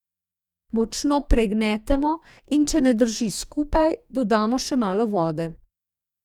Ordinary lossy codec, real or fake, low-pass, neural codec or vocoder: none; fake; 19.8 kHz; codec, 44.1 kHz, 2.6 kbps, DAC